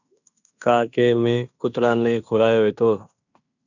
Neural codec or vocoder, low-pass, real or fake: codec, 16 kHz in and 24 kHz out, 0.9 kbps, LongCat-Audio-Codec, fine tuned four codebook decoder; 7.2 kHz; fake